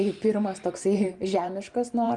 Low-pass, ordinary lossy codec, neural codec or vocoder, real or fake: 10.8 kHz; Opus, 32 kbps; vocoder, 44.1 kHz, 128 mel bands every 512 samples, BigVGAN v2; fake